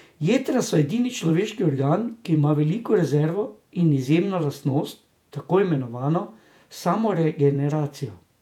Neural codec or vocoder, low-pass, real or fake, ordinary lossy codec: vocoder, 48 kHz, 128 mel bands, Vocos; 19.8 kHz; fake; none